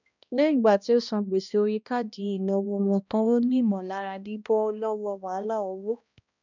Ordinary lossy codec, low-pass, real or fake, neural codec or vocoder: none; 7.2 kHz; fake; codec, 16 kHz, 1 kbps, X-Codec, HuBERT features, trained on balanced general audio